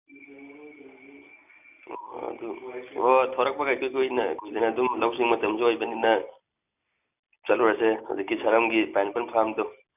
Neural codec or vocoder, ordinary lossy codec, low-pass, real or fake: none; none; 3.6 kHz; real